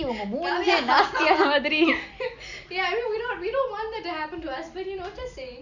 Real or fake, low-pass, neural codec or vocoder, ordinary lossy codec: real; 7.2 kHz; none; Opus, 64 kbps